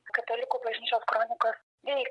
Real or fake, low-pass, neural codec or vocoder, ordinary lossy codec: real; 10.8 kHz; none; MP3, 64 kbps